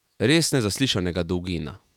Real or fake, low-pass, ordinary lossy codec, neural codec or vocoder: fake; 19.8 kHz; none; autoencoder, 48 kHz, 128 numbers a frame, DAC-VAE, trained on Japanese speech